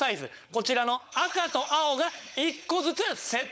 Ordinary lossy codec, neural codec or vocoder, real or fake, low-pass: none; codec, 16 kHz, 4.8 kbps, FACodec; fake; none